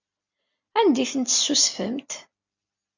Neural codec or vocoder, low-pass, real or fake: none; 7.2 kHz; real